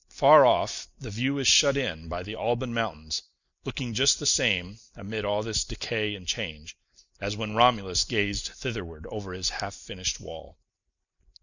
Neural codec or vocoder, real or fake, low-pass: none; real; 7.2 kHz